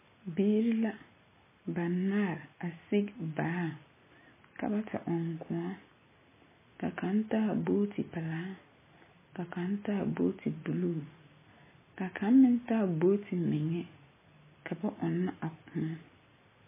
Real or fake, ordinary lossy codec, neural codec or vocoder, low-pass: real; MP3, 16 kbps; none; 3.6 kHz